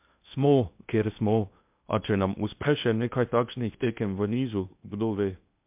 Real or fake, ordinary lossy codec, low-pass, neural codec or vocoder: fake; MP3, 32 kbps; 3.6 kHz; codec, 16 kHz in and 24 kHz out, 0.8 kbps, FocalCodec, streaming, 65536 codes